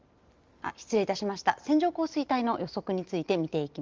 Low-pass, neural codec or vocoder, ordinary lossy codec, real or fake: 7.2 kHz; none; Opus, 32 kbps; real